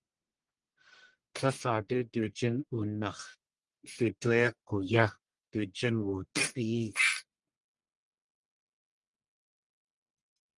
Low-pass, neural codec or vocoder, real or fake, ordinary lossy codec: 10.8 kHz; codec, 44.1 kHz, 1.7 kbps, Pupu-Codec; fake; Opus, 24 kbps